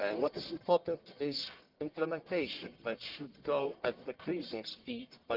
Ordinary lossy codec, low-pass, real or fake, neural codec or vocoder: Opus, 32 kbps; 5.4 kHz; fake; codec, 44.1 kHz, 1.7 kbps, Pupu-Codec